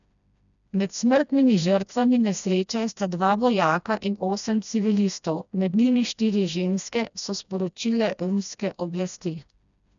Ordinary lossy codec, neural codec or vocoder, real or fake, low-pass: none; codec, 16 kHz, 1 kbps, FreqCodec, smaller model; fake; 7.2 kHz